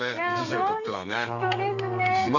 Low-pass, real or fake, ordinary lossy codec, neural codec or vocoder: 7.2 kHz; fake; none; codec, 32 kHz, 1.9 kbps, SNAC